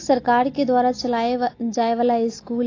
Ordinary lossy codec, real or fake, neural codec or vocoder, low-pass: AAC, 32 kbps; real; none; 7.2 kHz